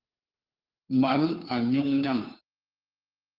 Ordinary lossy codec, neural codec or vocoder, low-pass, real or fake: Opus, 24 kbps; codec, 16 kHz, 2 kbps, FunCodec, trained on Chinese and English, 25 frames a second; 5.4 kHz; fake